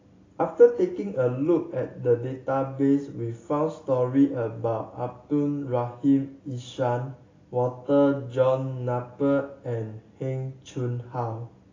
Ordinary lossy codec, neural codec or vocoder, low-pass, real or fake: AAC, 32 kbps; none; 7.2 kHz; real